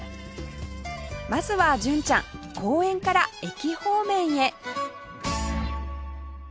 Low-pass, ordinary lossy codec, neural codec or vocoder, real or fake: none; none; none; real